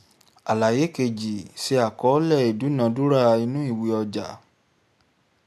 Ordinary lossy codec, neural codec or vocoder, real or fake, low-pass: none; none; real; 14.4 kHz